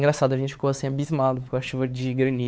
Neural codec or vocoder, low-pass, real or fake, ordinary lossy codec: codec, 16 kHz, 4 kbps, X-Codec, WavLM features, trained on Multilingual LibriSpeech; none; fake; none